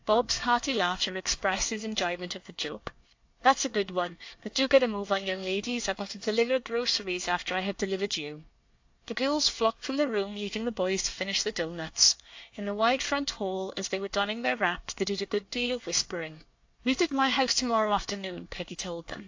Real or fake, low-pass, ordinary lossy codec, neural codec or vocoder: fake; 7.2 kHz; AAC, 48 kbps; codec, 24 kHz, 1 kbps, SNAC